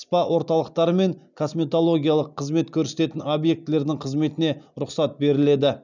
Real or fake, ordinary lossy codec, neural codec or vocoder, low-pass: real; none; none; 7.2 kHz